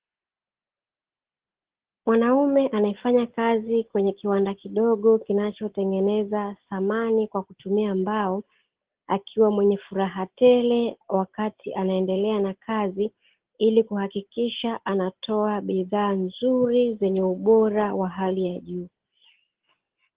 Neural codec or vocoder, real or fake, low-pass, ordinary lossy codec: none; real; 3.6 kHz; Opus, 16 kbps